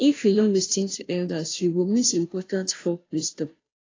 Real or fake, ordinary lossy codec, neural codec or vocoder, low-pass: fake; AAC, 32 kbps; codec, 16 kHz, 0.5 kbps, FunCodec, trained on Chinese and English, 25 frames a second; 7.2 kHz